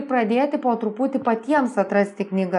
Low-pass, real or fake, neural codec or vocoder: 10.8 kHz; real; none